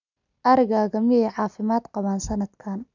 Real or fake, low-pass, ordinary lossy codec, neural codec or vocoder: real; 7.2 kHz; none; none